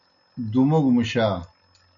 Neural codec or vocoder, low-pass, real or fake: none; 7.2 kHz; real